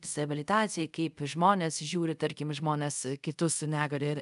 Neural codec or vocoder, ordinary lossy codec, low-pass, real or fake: codec, 24 kHz, 0.5 kbps, DualCodec; MP3, 96 kbps; 10.8 kHz; fake